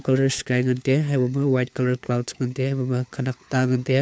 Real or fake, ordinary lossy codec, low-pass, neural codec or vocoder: fake; none; none; codec, 16 kHz, 4 kbps, FunCodec, trained on LibriTTS, 50 frames a second